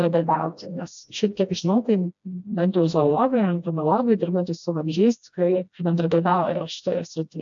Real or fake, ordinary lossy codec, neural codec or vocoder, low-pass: fake; AAC, 64 kbps; codec, 16 kHz, 1 kbps, FreqCodec, smaller model; 7.2 kHz